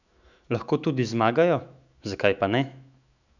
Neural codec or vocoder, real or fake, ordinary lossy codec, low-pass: autoencoder, 48 kHz, 128 numbers a frame, DAC-VAE, trained on Japanese speech; fake; none; 7.2 kHz